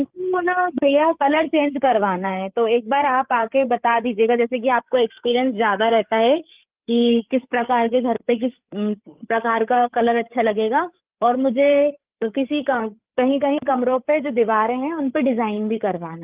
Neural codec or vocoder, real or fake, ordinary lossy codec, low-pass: codec, 16 kHz, 8 kbps, FreqCodec, larger model; fake; Opus, 32 kbps; 3.6 kHz